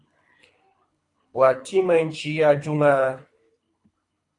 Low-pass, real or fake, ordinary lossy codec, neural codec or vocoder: 10.8 kHz; fake; AAC, 48 kbps; codec, 24 kHz, 3 kbps, HILCodec